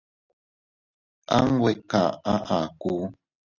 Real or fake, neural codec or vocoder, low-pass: real; none; 7.2 kHz